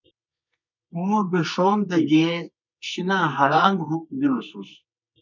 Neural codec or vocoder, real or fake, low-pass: codec, 24 kHz, 0.9 kbps, WavTokenizer, medium music audio release; fake; 7.2 kHz